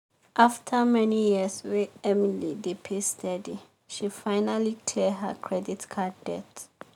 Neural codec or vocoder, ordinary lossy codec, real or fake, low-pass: none; none; real; 19.8 kHz